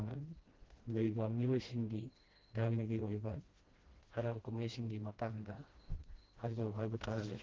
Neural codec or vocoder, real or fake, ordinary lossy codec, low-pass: codec, 16 kHz, 1 kbps, FreqCodec, smaller model; fake; Opus, 16 kbps; 7.2 kHz